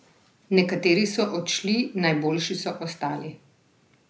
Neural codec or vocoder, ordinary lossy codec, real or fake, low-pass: none; none; real; none